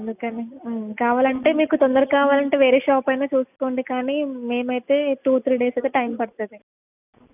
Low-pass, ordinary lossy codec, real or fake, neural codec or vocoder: 3.6 kHz; none; real; none